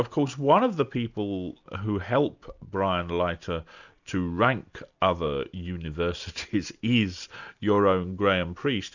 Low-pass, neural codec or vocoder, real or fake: 7.2 kHz; none; real